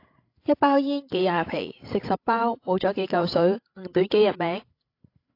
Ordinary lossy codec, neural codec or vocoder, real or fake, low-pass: AAC, 32 kbps; codec, 16 kHz, 16 kbps, FreqCodec, larger model; fake; 5.4 kHz